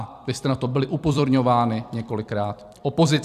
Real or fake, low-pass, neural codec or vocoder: fake; 14.4 kHz; vocoder, 44.1 kHz, 128 mel bands every 256 samples, BigVGAN v2